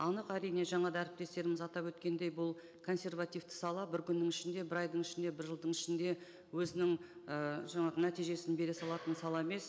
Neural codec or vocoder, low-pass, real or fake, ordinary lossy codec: none; none; real; none